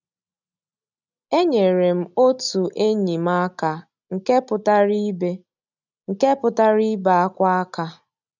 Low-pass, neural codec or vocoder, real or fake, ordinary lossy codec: 7.2 kHz; none; real; none